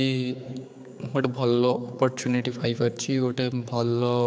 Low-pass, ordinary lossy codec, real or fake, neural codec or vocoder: none; none; fake; codec, 16 kHz, 4 kbps, X-Codec, HuBERT features, trained on general audio